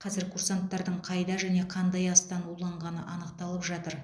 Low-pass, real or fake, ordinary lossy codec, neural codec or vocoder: none; real; none; none